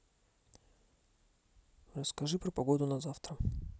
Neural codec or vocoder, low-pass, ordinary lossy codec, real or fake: none; none; none; real